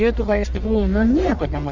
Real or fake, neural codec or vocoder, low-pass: fake; codec, 44.1 kHz, 1.7 kbps, Pupu-Codec; 7.2 kHz